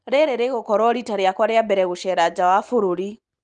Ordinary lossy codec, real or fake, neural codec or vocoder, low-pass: Opus, 32 kbps; real; none; 9.9 kHz